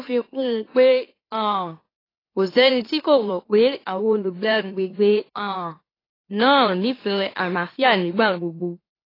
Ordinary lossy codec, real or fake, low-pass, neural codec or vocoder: AAC, 24 kbps; fake; 5.4 kHz; autoencoder, 44.1 kHz, a latent of 192 numbers a frame, MeloTTS